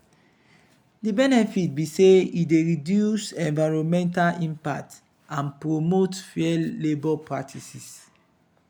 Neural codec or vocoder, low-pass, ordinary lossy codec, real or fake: none; 19.8 kHz; none; real